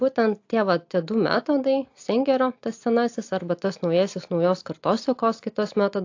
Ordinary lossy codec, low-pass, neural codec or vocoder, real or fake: AAC, 48 kbps; 7.2 kHz; none; real